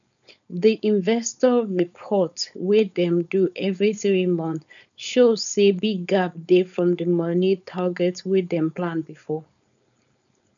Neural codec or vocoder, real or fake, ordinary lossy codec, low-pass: codec, 16 kHz, 4.8 kbps, FACodec; fake; none; 7.2 kHz